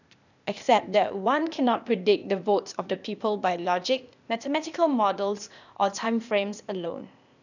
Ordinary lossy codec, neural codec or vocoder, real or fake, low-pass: none; codec, 16 kHz, 0.8 kbps, ZipCodec; fake; 7.2 kHz